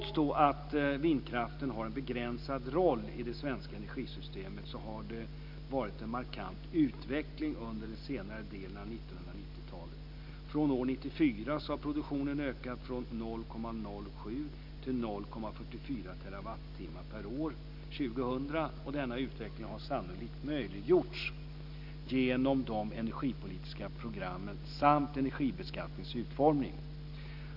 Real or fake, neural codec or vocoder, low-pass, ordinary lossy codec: real; none; 5.4 kHz; none